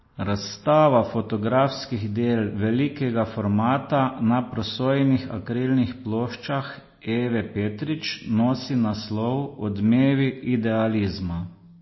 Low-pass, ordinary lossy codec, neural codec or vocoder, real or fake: 7.2 kHz; MP3, 24 kbps; none; real